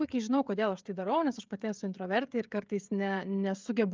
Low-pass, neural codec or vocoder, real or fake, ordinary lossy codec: 7.2 kHz; codec, 16 kHz, 16 kbps, FreqCodec, smaller model; fake; Opus, 32 kbps